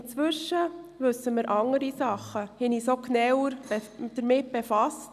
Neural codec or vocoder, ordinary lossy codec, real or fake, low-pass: none; none; real; 14.4 kHz